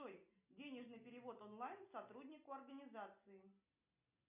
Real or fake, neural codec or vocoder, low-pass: real; none; 3.6 kHz